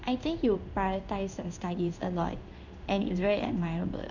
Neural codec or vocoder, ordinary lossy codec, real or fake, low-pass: codec, 16 kHz in and 24 kHz out, 1 kbps, XY-Tokenizer; none; fake; 7.2 kHz